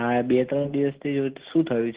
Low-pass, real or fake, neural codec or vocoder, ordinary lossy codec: 3.6 kHz; real; none; Opus, 16 kbps